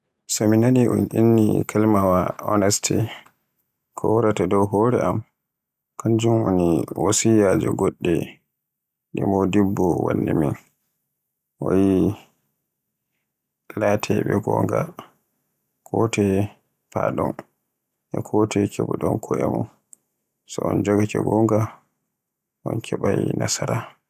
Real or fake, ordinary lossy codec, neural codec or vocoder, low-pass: real; none; none; 14.4 kHz